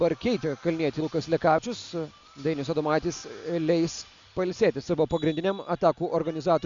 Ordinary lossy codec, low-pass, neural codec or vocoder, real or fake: MP3, 64 kbps; 7.2 kHz; none; real